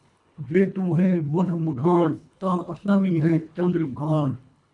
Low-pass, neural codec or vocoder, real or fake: 10.8 kHz; codec, 24 kHz, 1.5 kbps, HILCodec; fake